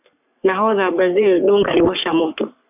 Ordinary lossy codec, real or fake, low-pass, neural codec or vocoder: AAC, 32 kbps; fake; 3.6 kHz; codec, 44.1 kHz, 3.4 kbps, Pupu-Codec